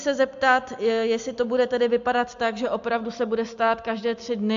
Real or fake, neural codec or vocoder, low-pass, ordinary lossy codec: real; none; 7.2 kHz; AAC, 64 kbps